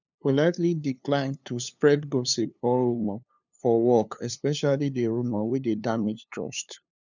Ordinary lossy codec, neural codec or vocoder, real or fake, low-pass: none; codec, 16 kHz, 2 kbps, FunCodec, trained on LibriTTS, 25 frames a second; fake; 7.2 kHz